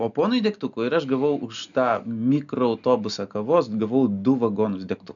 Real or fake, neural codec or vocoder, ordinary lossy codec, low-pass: real; none; MP3, 96 kbps; 7.2 kHz